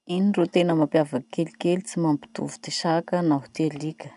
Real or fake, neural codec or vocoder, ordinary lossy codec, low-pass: real; none; none; 10.8 kHz